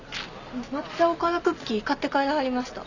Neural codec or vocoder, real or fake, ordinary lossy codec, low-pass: none; real; none; 7.2 kHz